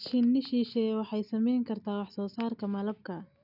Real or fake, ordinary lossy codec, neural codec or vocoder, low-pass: real; none; none; 5.4 kHz